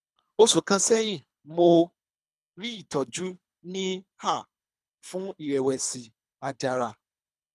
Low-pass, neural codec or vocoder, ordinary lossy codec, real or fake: none; codec, 24 kHz, 3 kbps, HILCodec; none; fake